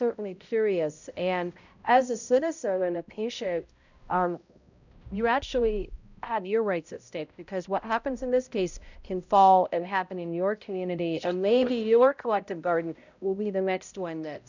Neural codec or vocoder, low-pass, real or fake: codec, 16 kHz, 0.5 kbps, X-Codec, HuBERT features, trained on balanced general audio; 7.2 kHz; fake